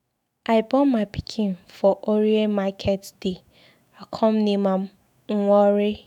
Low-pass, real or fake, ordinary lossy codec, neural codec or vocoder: 19.8 kHz; fake; none; autoencoder, 48 kHz, 128 numbers a frame, DAC-VAE, trained on Japanese speech